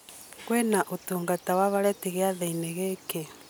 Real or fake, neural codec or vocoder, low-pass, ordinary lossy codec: real; none; none; none